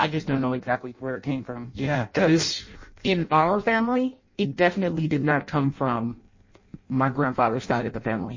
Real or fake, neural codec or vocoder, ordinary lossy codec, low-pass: fake; codec, 16 kHz in and 24 kHz out, 0.6 kbps, FireRedTTS-2 codec; MP3, 32 kbps; 7.2 kHz